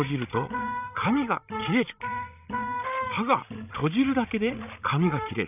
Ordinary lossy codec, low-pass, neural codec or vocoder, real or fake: none; 3.6 kHz; codec, 16 kHz, 16 kbps, FreqCodec, larger model; fake